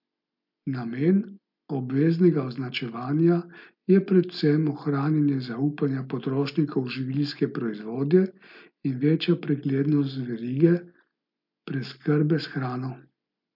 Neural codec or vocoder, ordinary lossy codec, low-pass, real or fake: none; MP3, 48 kbps; 5.4 kHz; real